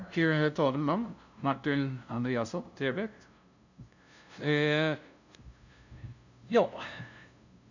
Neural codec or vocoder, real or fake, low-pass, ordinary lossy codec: codec, 16 kHz, 0.5 kbps, FunCodec, trained on LibriTTS, 25 frames a second; fake; 7.2 kHz; none